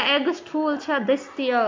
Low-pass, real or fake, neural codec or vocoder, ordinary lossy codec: 7.2 kHz; real; none; MP3, 64 kbps